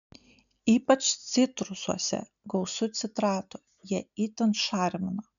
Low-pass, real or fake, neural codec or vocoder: 7.2 kHz; real; none